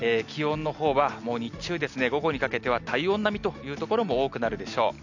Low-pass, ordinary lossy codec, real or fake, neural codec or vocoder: 7.2 kHz; none; real; none